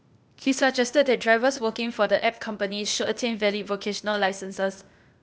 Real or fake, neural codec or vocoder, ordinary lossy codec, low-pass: fake; codec, 16 kHz, 0.8 kbps, ZipCodec; none; none